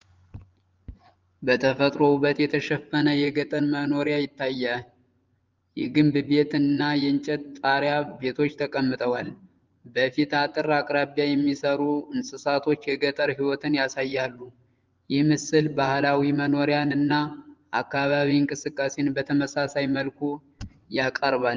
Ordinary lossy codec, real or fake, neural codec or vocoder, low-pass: Opus, 32 kbps; fake; vocoder, 24 kHz, 100 mel bands, Vocos; 7.2 kHz